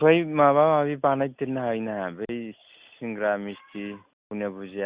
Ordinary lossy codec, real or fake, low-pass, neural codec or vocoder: Opus, 64 kbps; real; 3.6 kHz; none